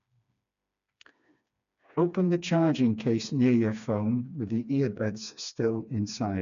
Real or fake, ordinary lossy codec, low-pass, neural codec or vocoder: fake; none; 7.2 kHz; codec, 16 kHz, 2 kbps, FreqCodec, smaller model